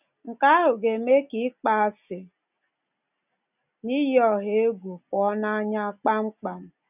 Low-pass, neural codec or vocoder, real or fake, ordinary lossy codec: 3.6 kHz; none; real; none